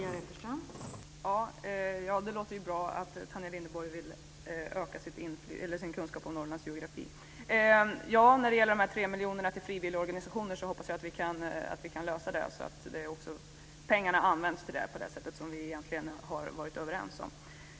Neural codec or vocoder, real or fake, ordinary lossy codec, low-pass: none; real; none; none